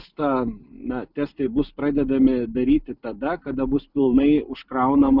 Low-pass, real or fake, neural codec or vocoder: 5.4 kHz; real; none